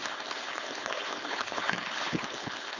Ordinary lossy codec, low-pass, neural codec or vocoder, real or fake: none; 7.2 kHz; codec, 16 kHz, 4.8 kbps, FACodec; fake